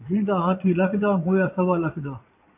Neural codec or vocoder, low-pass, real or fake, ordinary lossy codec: vocoder, 22.05 kHz, 80 mel bands, Vocos; 3.6 kHz; fake; AAC, 24 kbps